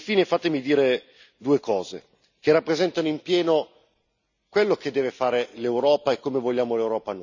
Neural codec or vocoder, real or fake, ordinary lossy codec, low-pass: none; real; none; 7.2 kHz